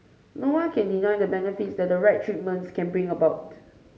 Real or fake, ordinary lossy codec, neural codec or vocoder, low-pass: real; none; none; none